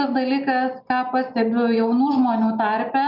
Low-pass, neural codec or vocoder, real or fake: 5.4 kHz; none; real